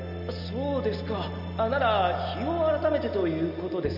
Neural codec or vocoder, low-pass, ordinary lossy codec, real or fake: none; 5.4 kHz; none; real